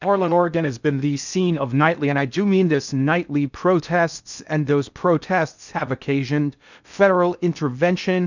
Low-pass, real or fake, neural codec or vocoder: 7.2 kHz; fake; codec, 16 kHz in and 24 kHz out, 0.6 kbps, FocalCodec, streaming, 2048 codes